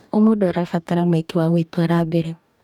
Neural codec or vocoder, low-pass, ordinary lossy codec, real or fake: codec, 44.1 kHz, 2.6 kbps, DAC; 19.8 kHz; none; fake